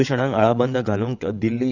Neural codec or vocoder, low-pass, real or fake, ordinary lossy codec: vocoder, 22.05 kHz, 80 mel bands, WaveNeXt; 7.2 kHz; fake; none